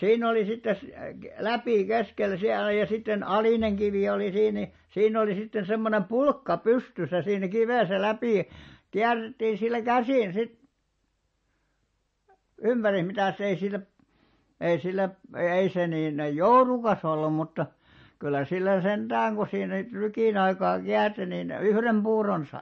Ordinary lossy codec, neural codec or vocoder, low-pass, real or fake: MP3, 32 kbps; none; 10.8 kHz; real